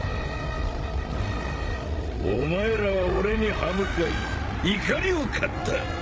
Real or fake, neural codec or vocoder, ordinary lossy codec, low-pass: fake; codec, 16 kHz, 16 kbps, FreqCodec, larger model; none; none